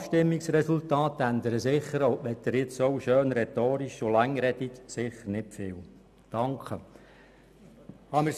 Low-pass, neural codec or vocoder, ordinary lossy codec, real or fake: 14.4 kHz; none; none; real